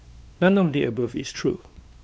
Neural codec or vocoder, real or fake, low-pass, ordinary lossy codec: codec, 16 kHz, 2 kbps, X-Codec, WavLM features, trained on Multilingual LibriSpeech; fake; none; none